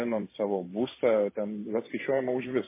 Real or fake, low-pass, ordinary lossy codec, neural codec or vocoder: fake; 3.6 kHz; MP3, 16 kbps; vocoder, 44.1 kHz, 128 mel bands every 512 samples, BigVGAN v2